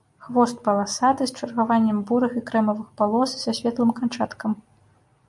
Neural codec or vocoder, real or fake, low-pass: none; real; 10.8 kHz